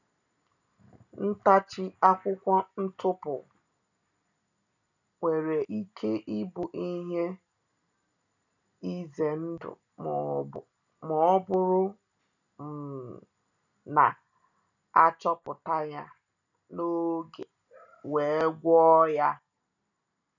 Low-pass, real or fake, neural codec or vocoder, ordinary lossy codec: 7.2 kHz; real; none; none